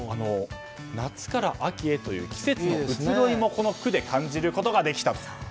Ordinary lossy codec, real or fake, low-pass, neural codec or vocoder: none; real; none; none